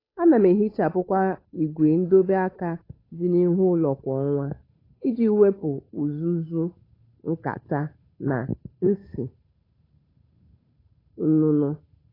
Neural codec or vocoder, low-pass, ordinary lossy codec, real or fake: codec, 16 kHz, 8 kbps, FunCodec, trained on Chinese and English, 25 frames a second; 5.4 kHz; AAC, 24 kbps; fake